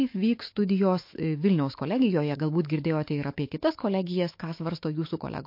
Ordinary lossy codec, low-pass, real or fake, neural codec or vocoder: MP3, 32 kbps; 5.4 kHz; fake; autoencoder, 48 kHz, 128 numbers a frame, DAC-VAE, trained on Japanese speech